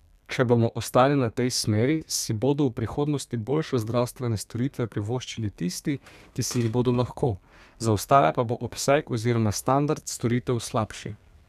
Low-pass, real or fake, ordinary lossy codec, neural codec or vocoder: 14.4 kHz; fake; none; codec, 32 kHz, 1.9 kbps, SNAC